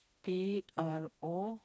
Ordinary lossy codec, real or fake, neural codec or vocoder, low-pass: none; fake; codec, 16 kHz, 2 kbps, FreqCodec, smaller model; none